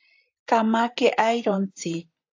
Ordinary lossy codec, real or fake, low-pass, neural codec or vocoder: AAC, 48 kbps; fake; 7.2 kHz; vocoder, 44.1 kHz, 128 mel bands, Pupu-Vocoder